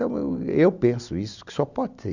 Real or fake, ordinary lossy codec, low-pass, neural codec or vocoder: real; none; 7.2 kHz; none